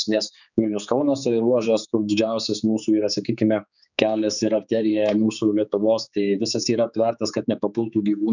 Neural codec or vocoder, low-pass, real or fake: codec, 16 kHz, 4 kbps, X-Codec, HuBERT features, trained on general audio; 7.2 kHz; fake